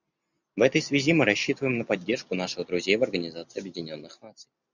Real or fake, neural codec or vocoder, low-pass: real; none; 7.2 kHz